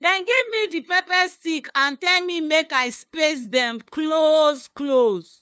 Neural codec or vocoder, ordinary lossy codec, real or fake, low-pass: codec, 16 kHz, 2 kbps, FunCodec, trained on LibriTTS, 25 frames a second; none; fake; none